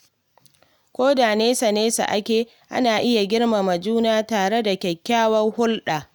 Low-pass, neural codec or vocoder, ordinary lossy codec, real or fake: none; none; none; real